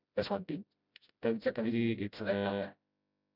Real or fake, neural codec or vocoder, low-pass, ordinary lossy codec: fake; codec, 16 kHz, 0.5 kbps, FreqCodec, smaller model; 5.4 kHz; none